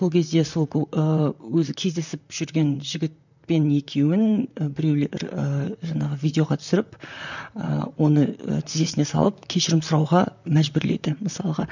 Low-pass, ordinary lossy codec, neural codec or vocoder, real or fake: 7.2 kHz; none; vocoder, 44.1 kHz, 128 mel bands, Pupu-Vocoder; fake